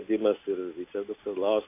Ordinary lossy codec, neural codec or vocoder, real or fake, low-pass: MP3, 24 kbps; none; real; 3.6 kHz